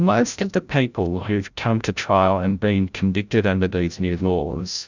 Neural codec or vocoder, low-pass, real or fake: codec, 16 kHz, 0.5 kbps, FreqCodec, larger model; 7.2 kHz; fake